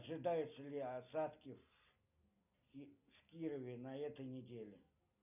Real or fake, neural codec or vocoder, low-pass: real; none; 3.6 kHz